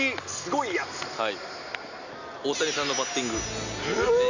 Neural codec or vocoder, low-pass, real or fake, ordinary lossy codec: none; 7.2 kHz; real; none